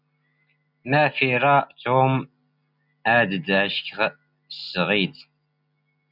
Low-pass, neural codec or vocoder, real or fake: 5.4 kHz; none; real